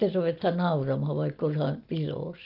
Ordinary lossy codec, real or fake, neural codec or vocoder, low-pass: Opus, 32 kbps; real; none; 5.4 kHz